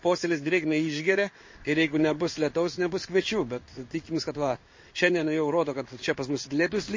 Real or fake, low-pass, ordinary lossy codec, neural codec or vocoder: fake; 7.2 kHz; MP3, 32 kbps; codec, 16 kHz in and 24 kHz out, 1 kbps, XY-Tokenizer